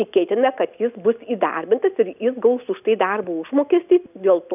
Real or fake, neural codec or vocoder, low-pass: real; none; 3.6 kHz